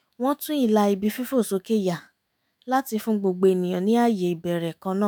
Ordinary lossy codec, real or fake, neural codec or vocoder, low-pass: none; fake; autoencoder, 48 kHz, 128 numbers a frame, DAC-VAE, trained on Japanese speech; none